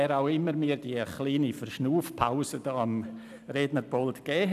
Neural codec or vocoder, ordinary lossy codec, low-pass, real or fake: none; none; 14.4 kHz; real